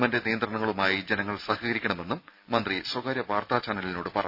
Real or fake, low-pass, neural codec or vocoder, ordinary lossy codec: real; 5.4 kHz; none; none